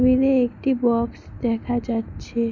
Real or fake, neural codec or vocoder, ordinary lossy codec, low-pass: real; none; none; 7.2 kHz